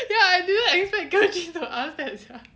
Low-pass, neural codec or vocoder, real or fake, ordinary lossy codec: none; none; real; none